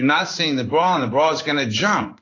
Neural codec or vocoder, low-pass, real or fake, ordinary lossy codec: codec, 16 kHz in and 24 kHz out, 1 kbps, XY-Tokenizer; 7.2 kHz; fake; AAC, 32 kbps